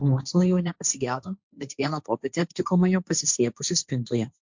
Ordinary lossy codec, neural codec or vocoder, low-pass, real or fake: MP3, 64 kbps; codec, 16 kHz, 1.1 kbps, Voila-Tokenizer; 7.2 kHz; fake